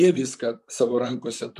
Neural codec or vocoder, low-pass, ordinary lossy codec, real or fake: vocoder, 44.1 kHz, 128 mel bands, Pupu-Vocoder; 14.4 kHz; MP3, 64 kbps; fake